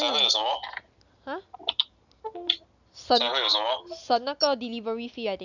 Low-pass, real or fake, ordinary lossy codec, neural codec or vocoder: 7.2 kHz; real; none; none